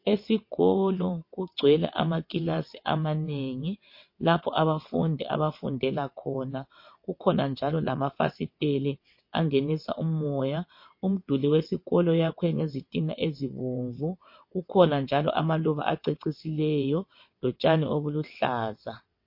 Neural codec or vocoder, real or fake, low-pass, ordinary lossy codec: vocoder, 22.05 kHz, 80 mel bands, WaveNeXt; fake; 5.4 kHz; MP3, 32 kbps